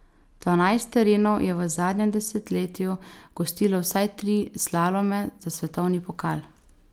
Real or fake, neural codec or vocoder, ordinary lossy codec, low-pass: real; none; Opus, 32 kbps; 19.8 kHz